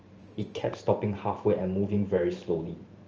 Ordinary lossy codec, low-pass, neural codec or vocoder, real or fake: Opus, 24 kbps; 7.2 kHz; vocoder, 44.1 kHz, 128 mel bands every 512 samples, BigVGAN v2; fake